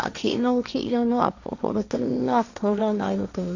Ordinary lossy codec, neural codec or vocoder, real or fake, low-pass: none; codec, 16 kHz, 1.1 kbps, Voila-Tokenizer; fake; 7.2 kHz